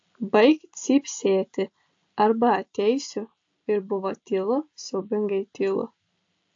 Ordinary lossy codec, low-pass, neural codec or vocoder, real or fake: AAC, 48 kbps; 7.2 kHz; none; real